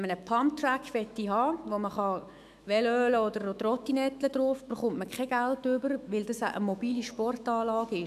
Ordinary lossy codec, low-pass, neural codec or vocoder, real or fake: none; 14.4 kHz; autoencoder, 48 kHz, 128 numbers a frame, DAC-VAE, trained on Japanese speech; fake